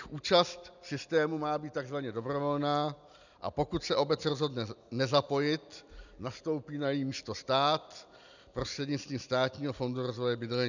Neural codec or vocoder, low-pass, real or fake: none; 7.2 kHz; real